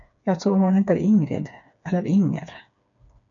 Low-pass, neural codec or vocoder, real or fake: 7.2 kHz; codec, 16 kHz, 4 kbps, FreqCodec, smaller model; fake